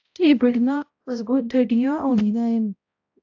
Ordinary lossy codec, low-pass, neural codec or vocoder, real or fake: AAC, 48 kbps; 7.2 kHz; codec, 16 kHz, 0.5 kbps, X-Codec, HuBERT features, trained on balanced general audio; fake